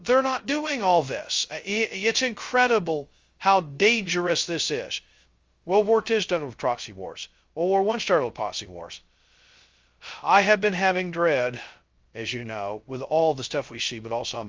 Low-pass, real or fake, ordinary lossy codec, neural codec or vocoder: 7.2 kHz; fake; Opus, 32 kbps; codec, 16 kHz, 0.2 kbps, FocalCodec